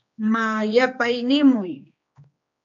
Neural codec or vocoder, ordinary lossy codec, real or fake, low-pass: codec, 16 kHz, 2 kbps, X-Codec, HuBERT features, trained on general audio; MP3, 48 kbps; fake; 7.2 kHz